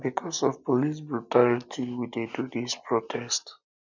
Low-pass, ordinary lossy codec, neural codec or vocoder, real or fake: 7.2 kHz; none; none; real